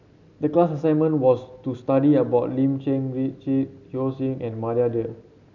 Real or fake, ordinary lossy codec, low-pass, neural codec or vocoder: real; none; 7.2 kHz; none